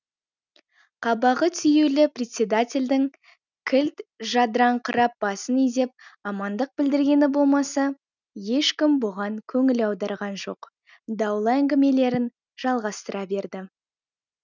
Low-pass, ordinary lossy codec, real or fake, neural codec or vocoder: 7.2 kHz; none; real; none